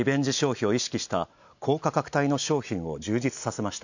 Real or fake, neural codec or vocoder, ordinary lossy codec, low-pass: real; none; none; 7.2 kHz